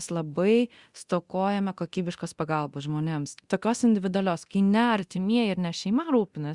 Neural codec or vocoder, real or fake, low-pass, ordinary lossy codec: codec, 24 kHz, 0.9 kbps, DualCodec; fake; 10.8 kHz; Opus, 64 kbps